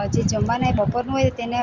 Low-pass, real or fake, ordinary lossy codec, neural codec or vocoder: 7.2 kHz; real; Opus, 32 kbps; none